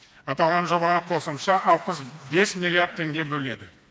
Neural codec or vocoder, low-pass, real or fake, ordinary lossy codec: codec, 16 kHz, 2 kbps, FreqCodec, smaller model; none; fake; none